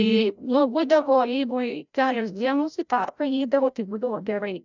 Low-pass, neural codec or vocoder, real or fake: 7.2 kHz; codec, 16 kHz, 0.5 kbps, FreqCodec, larger model; fake